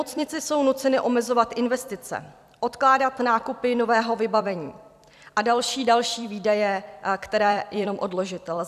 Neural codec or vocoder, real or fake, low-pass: vocoder, 44.1 kHz, 128 mel bands every 256 samples, BigVGAN v2; fake; 14.4 kHz